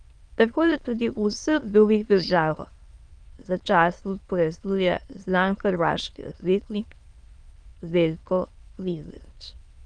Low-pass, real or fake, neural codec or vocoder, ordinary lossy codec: 9.9 kHz; fake; autoencoder, 22.05 kHz, a latent of 192 numbers a frame, VITS, trained on many speakers; Opus, 32 kbps